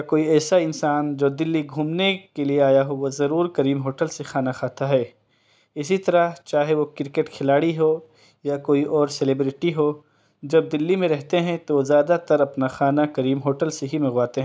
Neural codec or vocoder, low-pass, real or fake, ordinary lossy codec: none; none; real; none